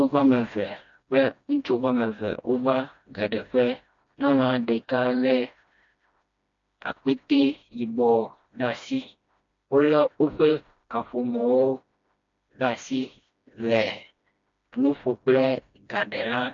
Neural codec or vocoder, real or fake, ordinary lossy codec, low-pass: codec, 16 kHz, 1 kbps, FreqCodec, smaller model; fake; MP3, 48 kbps; 7.2 kHz